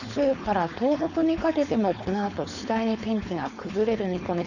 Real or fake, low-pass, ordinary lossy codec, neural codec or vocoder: fake; 7.2 kHz; none; codec, 16 kHz, 4.8 kbps, FACodec